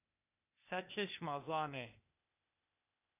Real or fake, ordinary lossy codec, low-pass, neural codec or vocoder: fake; AAC, 32 kbps; 3.6 kHz; codec, 16 kHz, 0.8 kbps, ZipCodec